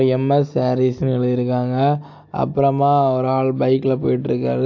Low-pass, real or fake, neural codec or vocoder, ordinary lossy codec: 7.2 kHz; real; none; AAC, 48 kbps